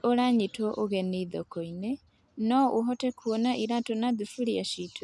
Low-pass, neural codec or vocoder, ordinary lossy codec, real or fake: none; none; none; real